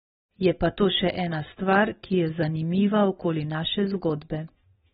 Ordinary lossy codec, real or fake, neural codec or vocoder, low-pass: AAC, 16 kbps; real; none; 19.8 kHz